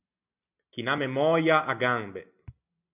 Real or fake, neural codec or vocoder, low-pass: real; none; 3.6 kHz